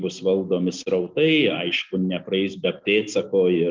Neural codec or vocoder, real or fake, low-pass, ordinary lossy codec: none; real; 7.2 kHz; Opus, 32 kbps